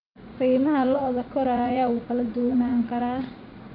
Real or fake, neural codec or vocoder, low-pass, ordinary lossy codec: fake; vocoder, 44.1 kHz, 80 mel bands, Vocos; 5.4 kHz; none